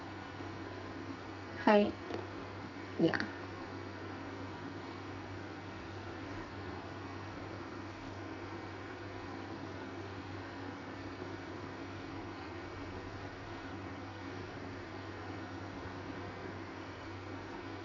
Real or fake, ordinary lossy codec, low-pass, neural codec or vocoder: fake; none; 7.2 kHz; codec, 44.1 kHz, 2.6 kbps, SNAC